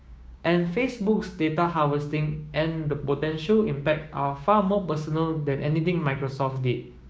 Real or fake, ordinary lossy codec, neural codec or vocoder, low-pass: fake; none; codec, 16 kHz, 6 kbps, DAC; none